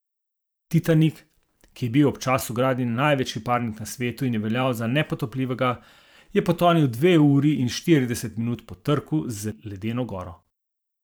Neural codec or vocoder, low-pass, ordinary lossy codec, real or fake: vocoder, 44.1 kHz, 128 mel bands every 512 samples, BigVGAN v2; none; none; fake